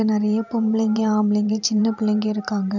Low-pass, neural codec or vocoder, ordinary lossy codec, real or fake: 7.2 kHz; vocoder, 44.1 kHz, 128 mel bands every 512 samples, BigVGAN v2; none; fake